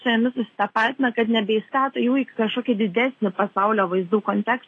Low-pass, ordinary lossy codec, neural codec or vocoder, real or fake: 10.8 kHz; AAC, 32 kbps; none; real